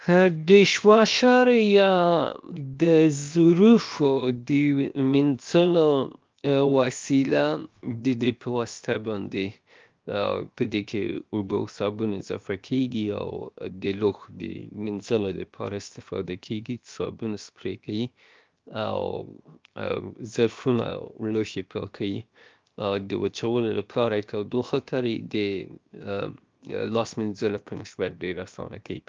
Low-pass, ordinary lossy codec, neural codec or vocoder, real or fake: 7.2 kHz; Opus, 24 kbps; codec, 16 kHz, 0.7 kbps, FocalCodec; fake